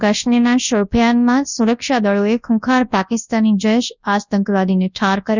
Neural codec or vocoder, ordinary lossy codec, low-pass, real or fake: codec, 24 kHz, 0.9 kbps, WavTokenizer, large speech release; none; 7.2 kHz; fake